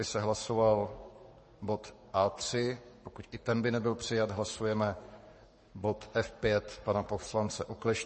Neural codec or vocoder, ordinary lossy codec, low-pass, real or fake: codec, 44.1 kHz, 7.8 kbps, Pupu-Codec; MP3, 32 kbps; 10.8 kHz; fake